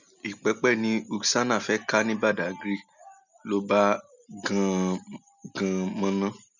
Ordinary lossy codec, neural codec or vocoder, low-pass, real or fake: none; none; 7.2 kHz; real